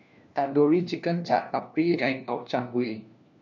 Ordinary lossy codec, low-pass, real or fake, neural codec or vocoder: none; 7.2 kHz; fake; codec, 16 kHz, 1 kbps, FunCodec, trained on LibriTTS, 50 frames a second